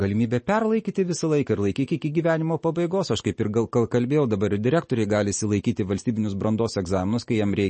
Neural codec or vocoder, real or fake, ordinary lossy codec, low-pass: none; real; MP3, 32 kbps; 10.8 kHz